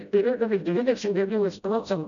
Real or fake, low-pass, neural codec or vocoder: fake; 7.2 kHz; codec, 16 kHz, 0.5 kbps, FreqCodec, smaller model